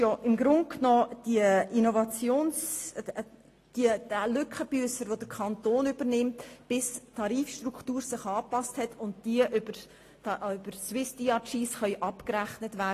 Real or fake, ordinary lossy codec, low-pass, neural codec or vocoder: real; AAC, 48 kbps; 14.4 kHz; none